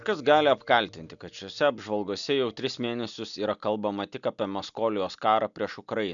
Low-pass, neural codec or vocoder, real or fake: 7.2 kHz; none; real